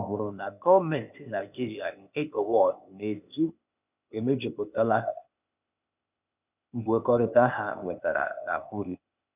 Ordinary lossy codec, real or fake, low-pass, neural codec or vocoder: none; fake; 3.6 kHz; codec, 16 kHz, 0.8 kbps, ZipCodec